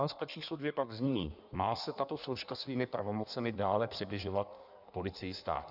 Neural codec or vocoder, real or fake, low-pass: codec, 16 kHz in and 24 kHz out, 1.1 kbps, FireRedTTS-2 codec; fake; 5.4 kHz